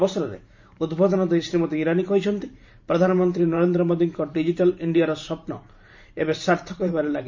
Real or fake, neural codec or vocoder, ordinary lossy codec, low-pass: fake; vocoder, 44.1 kHz, 128 mel bands every 512 samples, BigVGAN v2; MP3, 48 kbps; 7.2 kHz